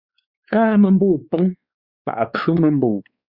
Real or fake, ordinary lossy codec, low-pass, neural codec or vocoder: fake; Opus, 64 kbps; 5.4 kHz; codec, 16 kHz, 2 kbps, X-Codec, WavLM features, trained on Multilingual LibriSpeech